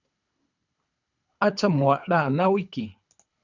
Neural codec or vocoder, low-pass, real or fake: codec, 24 kHz, 3 kbps, HILCodec; 7.2 kHz; fake